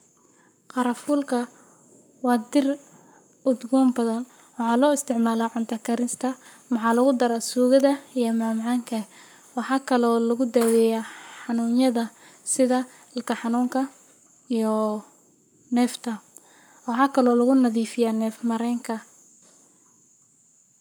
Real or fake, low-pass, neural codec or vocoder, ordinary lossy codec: fake; none; codec, 44.1 kHz, 7.8 kbps, Pupu-Codec; none